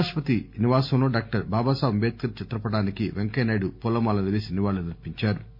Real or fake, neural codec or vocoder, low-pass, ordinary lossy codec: real; none; 5.4 kHz; MP3, 32 kbps